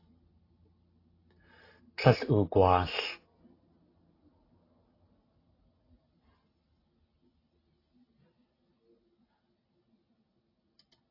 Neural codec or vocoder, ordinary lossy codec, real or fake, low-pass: none; AAC, 24 kbps; real; 5.4 kHz